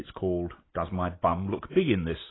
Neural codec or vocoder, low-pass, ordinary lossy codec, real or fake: none; 7.2 kHz; AAC, 16 kbps; real